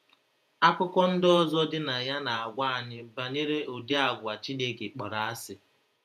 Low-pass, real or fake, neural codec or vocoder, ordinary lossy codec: 14.4 kHz; fake; vocoder, 48 kHz, 128 mel bands, Vocos; none